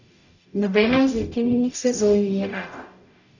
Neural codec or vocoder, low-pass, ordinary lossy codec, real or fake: codec, 44.1 kHz, 0.9 kbps, DAC; 7.2 kHz; none; fake